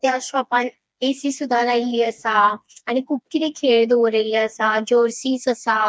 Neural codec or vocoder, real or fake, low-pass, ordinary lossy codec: codec, 16 kHz, 2 kbps, FreqCodec, smaller model; fake; none; none